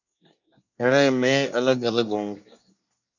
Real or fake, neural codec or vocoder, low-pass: fake; codec, 24 kHz, 1 kbps, SNAC; 7.2 kHz